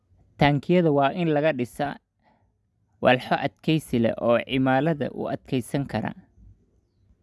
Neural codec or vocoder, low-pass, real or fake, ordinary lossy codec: none; none; real; none